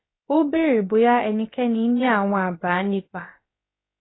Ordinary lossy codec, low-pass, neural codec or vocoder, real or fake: AAC, 16 kbps; 7.2 kHz; codec, 16 kHz, about 1 kbps, DyCAST, with the encoder's durations; fake